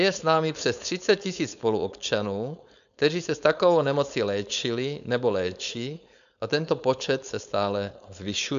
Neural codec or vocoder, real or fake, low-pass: codec, 16 kHz, 4.8 kbps, FACodec; fake; 7.2 kHz